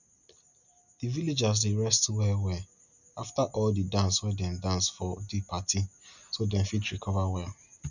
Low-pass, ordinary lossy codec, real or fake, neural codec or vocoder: 7.2 kHz; none; real; none